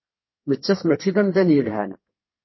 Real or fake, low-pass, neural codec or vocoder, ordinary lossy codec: fake; 7.2 kHz; codec, 32 kHz, 1.9 kbps, SNAC; MP3, 24 kbps